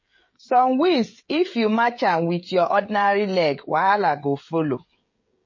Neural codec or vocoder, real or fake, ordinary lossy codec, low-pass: codec, 16 kHz, 16 kbps, FreqCodec, smaller model; fake; MP3, 32 kbps; 7.2 kHz